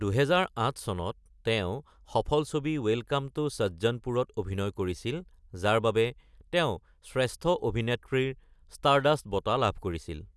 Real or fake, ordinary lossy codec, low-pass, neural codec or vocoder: real; none; none; none